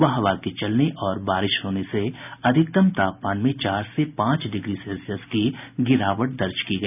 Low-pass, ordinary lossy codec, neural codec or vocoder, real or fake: 3.6 kHz; none; none; real